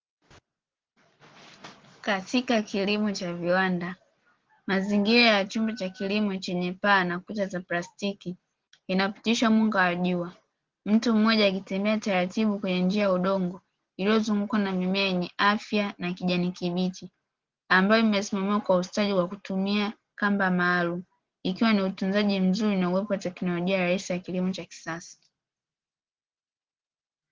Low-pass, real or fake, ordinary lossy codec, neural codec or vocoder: 7.2 kHz; real; Opus, 16 kbps; none